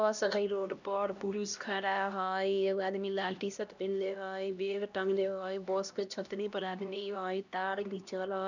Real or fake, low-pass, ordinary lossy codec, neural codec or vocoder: fake; 7.2 kHz; none; codec, 16 kHz, 1 kbps, X-Codec, HuBERT features, trained on LibriSpeech